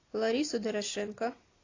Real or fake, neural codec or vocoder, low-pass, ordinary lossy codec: real; none; 7.2 kHz; AAC, 32 kbps